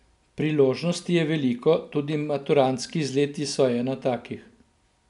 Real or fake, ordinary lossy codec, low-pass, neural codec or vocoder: real; none; 10.8 kHz; none